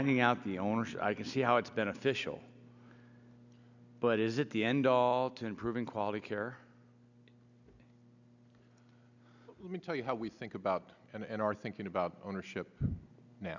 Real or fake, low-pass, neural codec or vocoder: real; 7.2 kHz; none